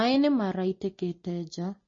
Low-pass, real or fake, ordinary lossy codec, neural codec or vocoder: 7.2 kHz; real; MP3, 32 kbps; none